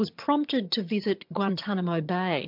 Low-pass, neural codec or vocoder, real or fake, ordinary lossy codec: 5.4 kHz; vocoder, 22.05 kHz, 80 mel bands, HiFi-GAN; fake; MP3, 48 kbps